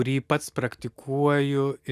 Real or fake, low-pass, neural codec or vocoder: real; 14.4 kHz; none